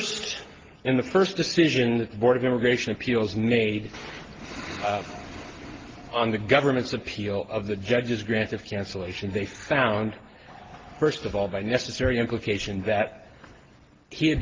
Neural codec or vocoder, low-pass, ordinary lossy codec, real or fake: none; 7.2 kHz; Opus, 16 kbps; real